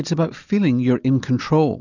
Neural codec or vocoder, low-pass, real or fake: none; 7.2 kHz; real